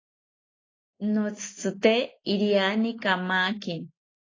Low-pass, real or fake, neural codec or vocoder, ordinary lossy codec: 7.2 kHz; real; none; AAC, 32 kbps